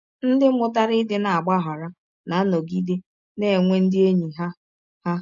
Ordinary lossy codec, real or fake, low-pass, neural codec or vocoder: none; real; 7.2 kHz; none